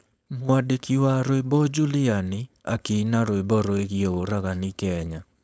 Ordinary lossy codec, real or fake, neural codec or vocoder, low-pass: none; fake; codec, 16 kHz, 4.8 kbps, FACodec; none